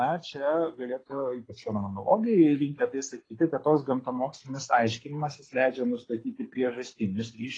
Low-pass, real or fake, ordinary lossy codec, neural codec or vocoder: 9.9 kHz; fake; AAC, 32 kbps; codec, 24 kHz, 6 kbps, HILCodec